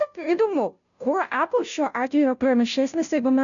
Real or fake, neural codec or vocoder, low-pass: fake; codec, 16 kHz, 0.5 kbps, FunCodec, trained on Chinese and English, 25 frames a second; 7.2 kHz